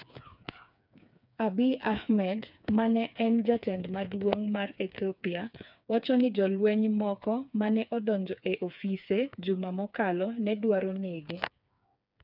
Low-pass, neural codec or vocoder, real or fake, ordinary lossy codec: 5.4 kHz; codec, 16 kHz, 4 kbps, FreqCodec, smaller model; fake; none